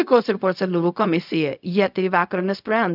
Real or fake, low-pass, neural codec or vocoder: fake; 5.4 kHz; codec, 16 kHz, 0.4 kbps, LongCat-Audio-Codec